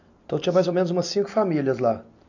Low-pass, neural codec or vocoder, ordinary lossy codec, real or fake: 7.2 kHz; none; none; real